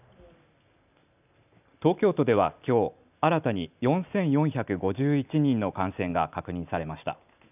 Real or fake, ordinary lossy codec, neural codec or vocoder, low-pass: real; none; none; 3.6 kHz